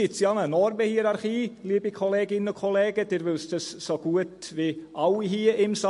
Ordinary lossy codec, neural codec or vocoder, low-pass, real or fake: MP3, 48 kbps; none; 14.4 kHz; real